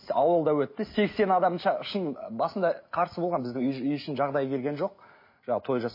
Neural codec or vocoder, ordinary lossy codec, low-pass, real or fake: none; MP3, 24 kbps; 5.4 kHz; real